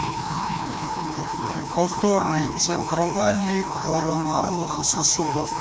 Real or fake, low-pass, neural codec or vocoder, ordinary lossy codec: fake; none; codec, 16 kHz, 1 kbps, FreqCodec, larger model; none